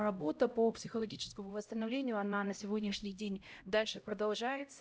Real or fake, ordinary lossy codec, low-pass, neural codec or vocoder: fake; none; none; codec, 16 kHz, 0.5 kbps, X-Codec, HuBERT features, trained on LibriSpeech